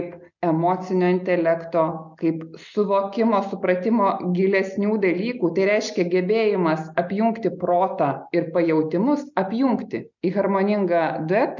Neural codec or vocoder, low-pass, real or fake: none; 7.2 kHz; real